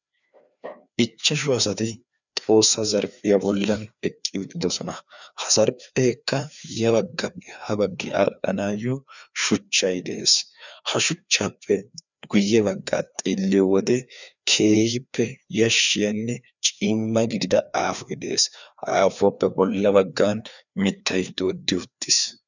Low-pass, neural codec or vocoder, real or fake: 7.2 kHz; codec, 16 kHz, 2 kbps, FreqCodec, larger model; fake